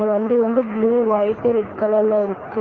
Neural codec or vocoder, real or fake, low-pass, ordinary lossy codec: codec, 24 kHz, 3 kbps, HILCodec; fake; 7.2 kHz; Opus, 32 kbps